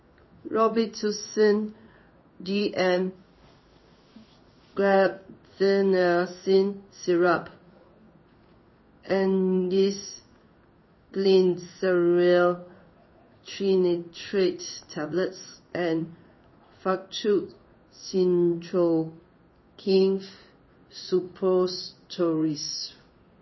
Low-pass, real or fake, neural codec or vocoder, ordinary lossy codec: 7.2 kHz; fake; codec, 16 kHz in and 24 kHz out, 1 kbps, XY-Tokenizer; MP3, 24 kbps